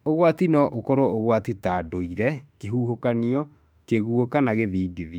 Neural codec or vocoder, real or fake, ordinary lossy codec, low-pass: autoencoder, 48 kHz, 32 numbers a frame, DAC-VAE, trained on Japanese speech; fake; none; 19.8 kHz